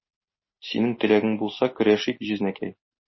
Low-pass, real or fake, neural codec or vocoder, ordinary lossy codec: 7.2 kHz; real; none; MP3, 24 kbps